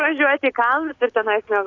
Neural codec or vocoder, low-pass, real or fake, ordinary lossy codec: none; 7.2 kHz; real; AAC, 48 kbps